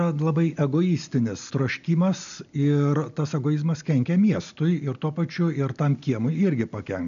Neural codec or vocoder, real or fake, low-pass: none; real; 7.2 kHz